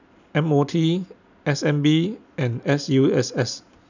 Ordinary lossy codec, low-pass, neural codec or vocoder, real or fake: none; 7.2 kHz; none; real